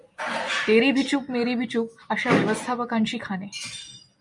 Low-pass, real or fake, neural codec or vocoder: 10.8 kHz; real; none